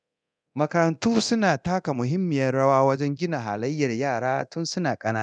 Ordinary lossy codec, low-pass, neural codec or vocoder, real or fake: none; 9.9 kHz; codec, 24 kHz, 0.9 kbps, DualCodec; fake